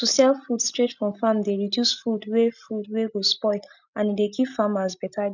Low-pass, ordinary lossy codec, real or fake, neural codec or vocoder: 7.2 kHz; none; real; none